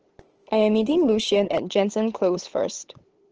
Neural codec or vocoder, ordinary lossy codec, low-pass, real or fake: codec, 16 kHz, 8 kbps, FunCodec, trained on LibriTTS, 25 frames a second; Opus, 16 kbps; 7.2 kHz; fake